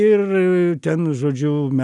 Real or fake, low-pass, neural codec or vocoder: real; 10.8 kHz; none